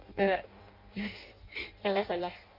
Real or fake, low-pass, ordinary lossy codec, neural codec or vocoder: fake; 5.4 kHz; MP3, 32 kbps; codec, 16 kHz in and 24 kHz out, 0.6 kbps, FireRedTTS-2 codec